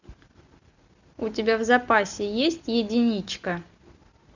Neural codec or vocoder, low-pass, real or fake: none; 7.2 kHz; real